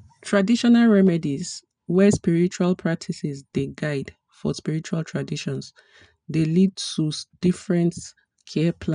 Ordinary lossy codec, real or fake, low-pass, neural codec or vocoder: none; real; 9.9 kHz; none